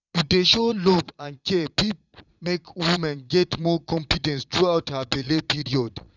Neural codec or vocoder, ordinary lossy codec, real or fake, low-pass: vocoder, 22.05 kHz, 80 mel bands, Vocos; none; fake; 7.2 kHz